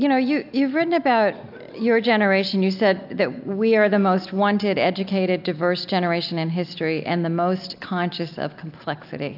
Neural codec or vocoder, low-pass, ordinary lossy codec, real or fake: none; 5.4 kHz; AAC, 48 kbps; real